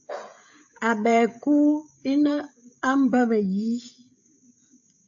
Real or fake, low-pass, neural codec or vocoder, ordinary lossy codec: fake; 7.2 kHz; codec, 16 kHz, 16 kbps, FreqCodec, smaller model; AAC, 64 kbps